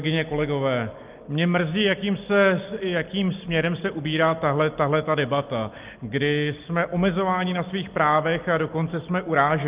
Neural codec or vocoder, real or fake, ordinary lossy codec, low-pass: none; real; Opus, 32 kbps; 3.6 kHz